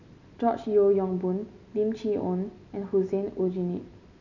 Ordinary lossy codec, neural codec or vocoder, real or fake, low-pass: none; none; real; 7.2 kHz